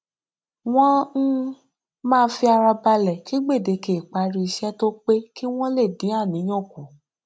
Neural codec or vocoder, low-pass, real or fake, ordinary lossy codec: none; none; real; none